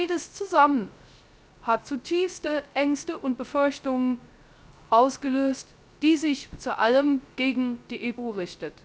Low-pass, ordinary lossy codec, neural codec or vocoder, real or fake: none; none; codec, 16 kHz, 0.3 kbps, FocalCodec; fake